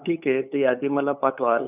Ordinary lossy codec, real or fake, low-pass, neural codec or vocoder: none; fake; 3.6 kHz; codec, 16 kHz, 8 kbps, FunCodec, trained on LibriTTS, 25 frames a second